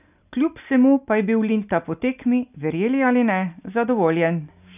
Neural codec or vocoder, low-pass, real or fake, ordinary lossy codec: none; 3.6 kHz; real; none